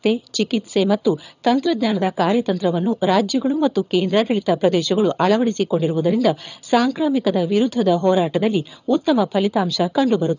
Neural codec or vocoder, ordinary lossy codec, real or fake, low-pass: vocoder, 22.05 kHz, 80 mel bands, HiFi-GAN; none; fake; 7.2 kHz